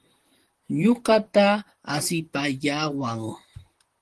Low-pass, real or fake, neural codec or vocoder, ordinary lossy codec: 10.8 kHz; fake; vocoder, 44.1 kHz, 128 mel bands, Pupu-Vocoder; Opus, 16 kbps